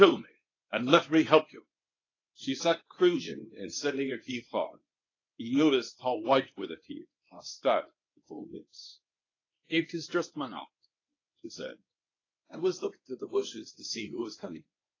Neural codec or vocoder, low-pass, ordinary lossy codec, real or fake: codec, 24 kHz, 0.9 kbps, WavTokenizer, medium speech release version 1; 7.2 kHz; AAC, 32 kbps; fake